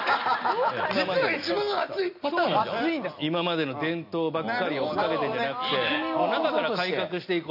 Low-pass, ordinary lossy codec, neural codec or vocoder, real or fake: 5.4 kHz; none; none; real